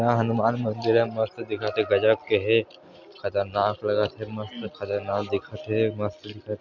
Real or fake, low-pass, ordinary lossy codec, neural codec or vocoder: real; 7.2 kHz; MP3, 64 kbps; none